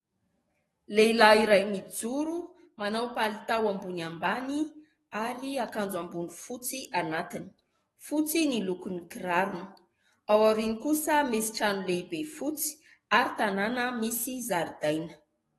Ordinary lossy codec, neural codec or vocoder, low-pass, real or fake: AAC, 32 kbps; codec, 44.1 kHz, 7.8 kbps, DAC; 19.8 kHz; fake